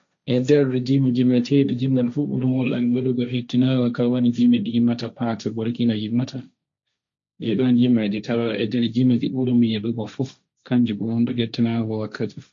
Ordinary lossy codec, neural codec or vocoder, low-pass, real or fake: MP3, 48 kbps; codec, 16 kHz, 1.1 kbps, Voila-Tokenizer; 7.2 kHz; fake